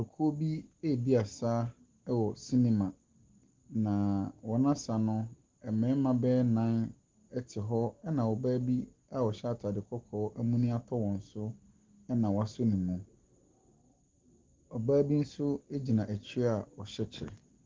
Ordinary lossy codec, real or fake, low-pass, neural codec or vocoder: Opus, 16 kbps; real; 7.2 kHz; none